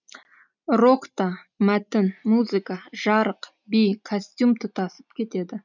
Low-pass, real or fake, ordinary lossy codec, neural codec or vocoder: 7.2 kHz; real; none; none